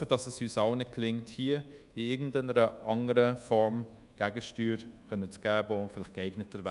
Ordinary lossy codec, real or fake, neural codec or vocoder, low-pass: none; fake; codec, 24 kHz, 1.2 kbps, DualCodec; 10.8 kHz